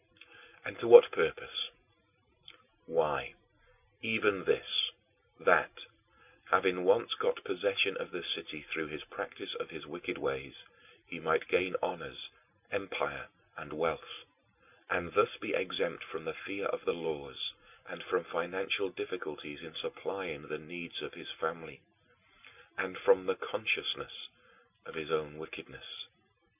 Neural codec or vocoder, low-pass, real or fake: none; 3.6 kHz; real